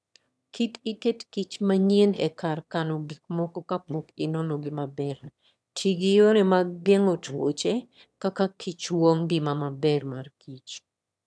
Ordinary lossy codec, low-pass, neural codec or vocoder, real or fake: none; none; autoencoder, 22.05 kHz, a latent of 192 numbers a frame, VITS, trained on one speaker; fake